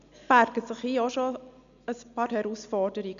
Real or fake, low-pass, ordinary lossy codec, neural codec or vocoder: real; 7.2 kHz; none; none